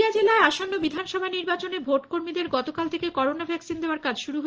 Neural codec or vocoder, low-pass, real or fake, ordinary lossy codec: none; 7.2 kHz; real; Opus, 16 kbps